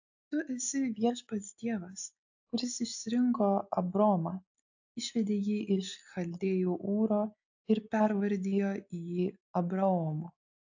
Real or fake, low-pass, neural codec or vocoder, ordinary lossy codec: real; 7.2 kHz; none; AAC, 48 kbps